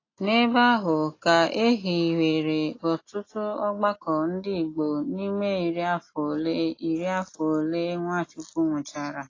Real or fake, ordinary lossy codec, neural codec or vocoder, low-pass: real; AAC, 32 kbps; none; 7.2 kHz